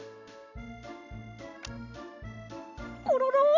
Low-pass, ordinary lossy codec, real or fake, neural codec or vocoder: 7.2 kHz; none; real; none